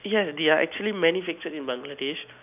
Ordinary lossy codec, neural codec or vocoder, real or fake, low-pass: none; none; real; 3.6 kHz